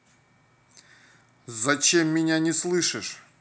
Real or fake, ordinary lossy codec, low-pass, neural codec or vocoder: real; none; none; none